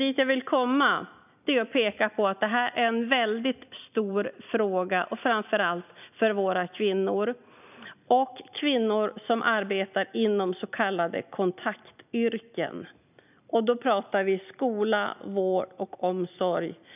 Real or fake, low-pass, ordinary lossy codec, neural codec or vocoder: real; 3.6 kHz; none; none